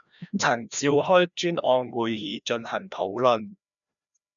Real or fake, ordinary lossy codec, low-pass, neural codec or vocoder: fake; MP3, 96 kbps; 7.2 kHz; codec, 16 kHz, 1 kbps, FreqCodec, larger model